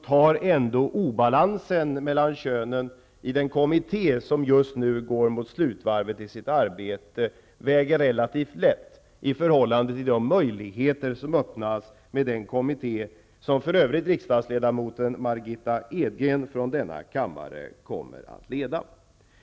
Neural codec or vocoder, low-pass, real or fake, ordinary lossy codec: none; none; real; none